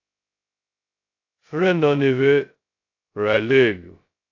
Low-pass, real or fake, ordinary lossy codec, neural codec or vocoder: 7.2 kHz; fake; AAC, 48 kbps; codec, 16 kHz, 0.2 kbps, FocalCodec